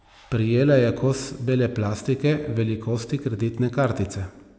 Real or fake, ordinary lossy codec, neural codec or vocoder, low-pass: real; none; none; none